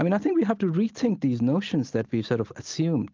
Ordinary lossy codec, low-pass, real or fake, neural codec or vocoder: Opus, 32 kbps; 7.2 kHz; real; none